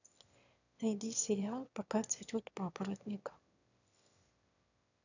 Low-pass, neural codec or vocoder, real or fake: 7.2 kHz; autoencoder, 22.05 kHz, a latent of 192 numbers a frame, VITS, trained on one speaker; fake